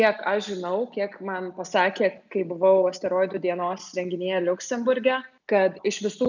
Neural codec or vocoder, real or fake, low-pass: none; real; 7.2 kHz